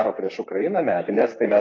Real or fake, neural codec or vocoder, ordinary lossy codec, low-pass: fake; vocoder, 44.1 kHz, 128 mel bands, Pupu-Vocoder; AAC, 32 kbps; 7.2 kHz